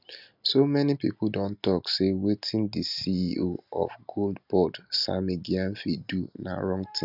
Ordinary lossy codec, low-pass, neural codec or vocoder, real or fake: none; 5.4 kHz; none; real